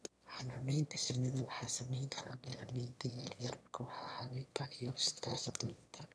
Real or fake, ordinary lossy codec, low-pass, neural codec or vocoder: fake; none; none; autoencoder, 22.05 kHz, a latent of 192 numbers a frame, VITS, trained on one speaker